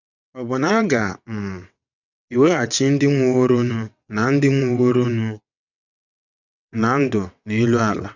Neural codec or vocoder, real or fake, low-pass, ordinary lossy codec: vocoder, 22.05 kHz, 80 mel bands, WaveNeXt; fake; 7.2 kHz; none